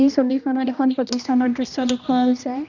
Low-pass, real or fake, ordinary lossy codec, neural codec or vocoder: 7.2 kHz; fake; none; codec, 16 kHz, 1 kbps, X-Codec, HuBERT features, trained on general audio